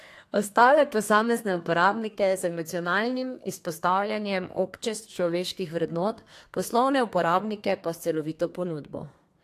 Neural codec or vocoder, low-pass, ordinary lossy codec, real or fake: codec, 32 kHz, 1.9 kbps, SNAC; 14.4 kHz; AAC, 64 kbps; fake